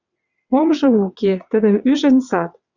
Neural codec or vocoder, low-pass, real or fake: vocoder, 22.05 kHz, 80 mel bands, WaveNeXt; 7.2 kHz; fake